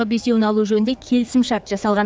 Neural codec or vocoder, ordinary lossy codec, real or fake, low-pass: codec, 16 kHz, 4 kbps, X-Codec, HuBERT features, trained on general audio; none; fake; none